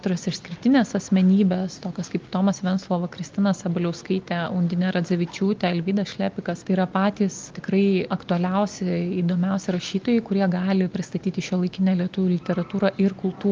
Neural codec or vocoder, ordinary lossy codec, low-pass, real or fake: none; Opus, 32 kbps; 7.2 kHz; real